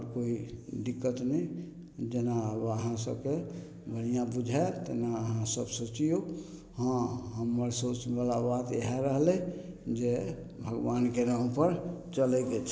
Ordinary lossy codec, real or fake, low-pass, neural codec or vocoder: none; real; none; none